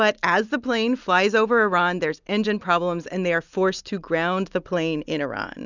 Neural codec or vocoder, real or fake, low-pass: none; real; 7.2 kHz